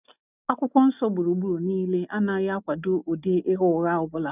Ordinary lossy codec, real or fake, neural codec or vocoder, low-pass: none; real; none; 3.6 kHz